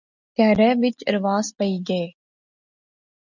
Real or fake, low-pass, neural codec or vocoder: real; 7.2 kHz; none